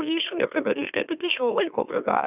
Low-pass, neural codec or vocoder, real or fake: 3.6 kHz; autoencoder, 44.1 kHz, a latent of 192 numbers a frame, MeloTTS; fake